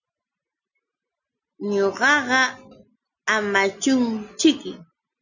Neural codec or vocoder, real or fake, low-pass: none; real; 7.2 kHz